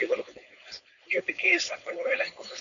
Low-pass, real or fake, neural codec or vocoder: 7.2 kHz; fake; codec, 16 kHz, 4.8 kbps, FACodec